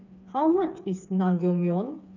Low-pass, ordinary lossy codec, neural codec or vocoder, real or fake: 7.2 kHz; none; codec, 16 kHz, 4 kbps, FreqCodec, smaller model; fake